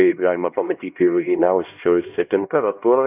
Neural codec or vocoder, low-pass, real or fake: codec, 16 kHz, 1 kbps, X-Codec, HuBERT features, trained on LibriSpeech; 3.6 kHz; fake